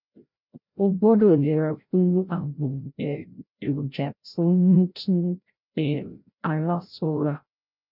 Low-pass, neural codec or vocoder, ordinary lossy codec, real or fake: 5.4 kHz; codec, 16 kHz, 0.5 kbps, FreqCodec, larger model; none; fake